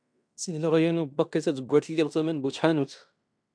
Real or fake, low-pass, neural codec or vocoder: fake; 9.9 kHz; codec, 16 kHz in and 24 kHz out, 0.9 kbps, LongCat-Audio-Codec, fine tuned four codebook decoder